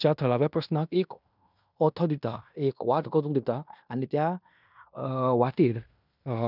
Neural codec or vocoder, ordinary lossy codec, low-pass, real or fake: codec, 16 kHz in and 24 kHz out, 0.9 kbps, LongCat-Audio-Codec, fine tuned four codebook decoder; none; 5.4 kHz; fake